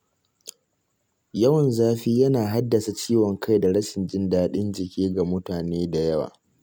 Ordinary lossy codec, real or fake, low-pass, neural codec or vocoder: none; real; none; none